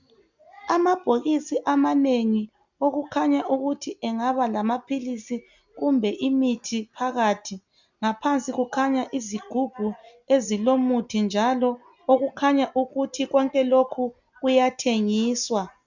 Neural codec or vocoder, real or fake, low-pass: none; real; 7.2 kHz